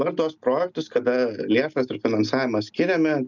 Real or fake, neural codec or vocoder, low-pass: real; none; 7.2 kHz